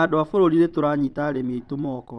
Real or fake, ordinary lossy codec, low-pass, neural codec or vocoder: fake; none; none; vocoder, 22.05 kHz, 80 mel bands, Vocos